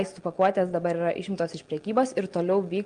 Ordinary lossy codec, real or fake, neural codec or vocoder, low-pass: Opus, 24 kbps; real; none; 9.9 kHz